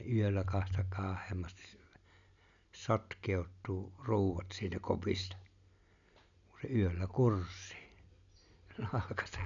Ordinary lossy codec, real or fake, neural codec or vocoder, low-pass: none; real; none; 7.2 kHz